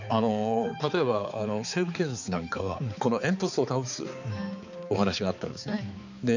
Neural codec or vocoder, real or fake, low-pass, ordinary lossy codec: codec, 16 kHz, 4 kbps, X-Codec, HuBERT features, trained on balanced general audio; fake; 7.2 kHz; none